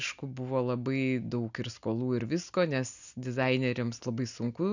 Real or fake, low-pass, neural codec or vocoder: real; 7.2 kHz; none